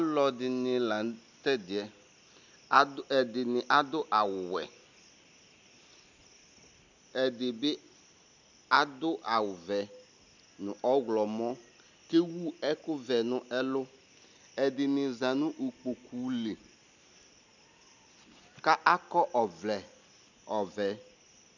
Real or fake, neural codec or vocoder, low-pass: real; none; 7.2 kHz